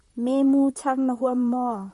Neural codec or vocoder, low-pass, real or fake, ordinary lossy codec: vocoder, 44.1 kHz, 128 mel bands, Pupu-Vocoder; 14.4 kHz; fake; MP3, 48 kbps